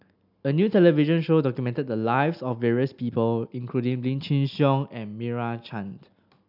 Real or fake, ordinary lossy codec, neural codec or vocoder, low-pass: real; none; none; 5.4 kHz